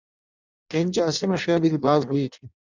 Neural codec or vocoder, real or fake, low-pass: codec, 16 kHz in and 24 kHz out, 0.6 kbps, FireRedTTS-2 codec; fake; 7.2 kHz